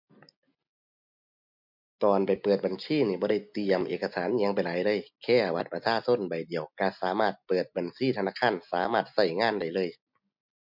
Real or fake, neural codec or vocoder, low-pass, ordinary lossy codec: real; none; 5.4 kHz; MP3, 48 kbps